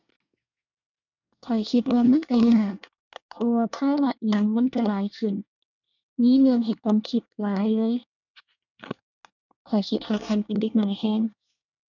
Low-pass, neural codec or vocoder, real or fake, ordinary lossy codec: 7.2 kHz; codec, 24 kHz, 1 kbps, SNAC; fake; none